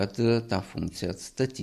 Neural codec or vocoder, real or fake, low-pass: none; real; 14.4 kHz